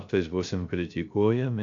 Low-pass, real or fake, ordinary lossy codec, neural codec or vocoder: 7.2 kHz; fake; AAC, 64 kbps; codec, 16 kHz, 0.3 kbps, FocalCodec